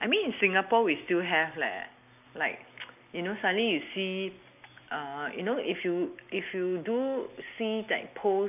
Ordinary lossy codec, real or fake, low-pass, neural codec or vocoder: none; real; 3.6 kHz; none